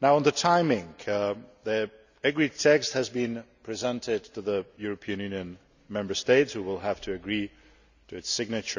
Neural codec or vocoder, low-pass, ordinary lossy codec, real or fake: none; 7.2 kHz; none; real